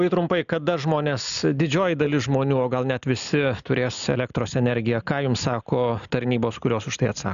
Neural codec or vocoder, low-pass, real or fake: none; 7.2 kHz; real